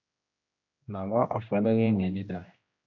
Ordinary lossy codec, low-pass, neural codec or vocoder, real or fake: AAC, 48 kbps; 7.2 kHz; codec, 16 kHz, 1 kbps, X-Codec, HuBERT features, trained on general audio; fake